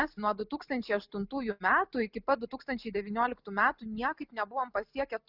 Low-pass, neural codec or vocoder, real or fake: 5.4 kHz; none; real